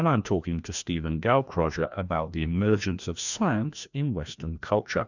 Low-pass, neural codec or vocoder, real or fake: 7.2 kHz; codec, 16 kHz, 1 kbps, FreqCodec, larger model; fake